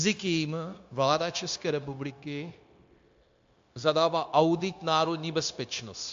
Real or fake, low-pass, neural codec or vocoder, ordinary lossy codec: fake; 7.2 kHz; codec, 16 kHz, 0.9 kbps, LongCat-Audio-Codec; AAC, 64 kbps